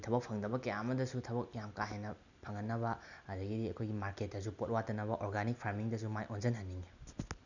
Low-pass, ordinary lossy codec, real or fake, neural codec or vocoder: 7.2 kHz; none; real; none